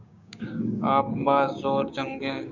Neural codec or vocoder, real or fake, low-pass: codec, 16 kHz, 6 kbps, DAC; fake; 7.2 kHz